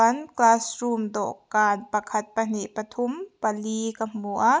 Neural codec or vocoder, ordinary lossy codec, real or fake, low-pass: none; none; real; none